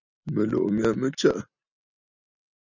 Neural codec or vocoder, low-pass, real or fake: none; 7.2 kHz; real